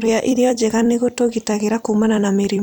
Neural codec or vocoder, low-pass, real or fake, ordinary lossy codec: vocoder, 44.1 kHz, 128 mel bands every 256 samples, BigVGAN v2; none; fake; none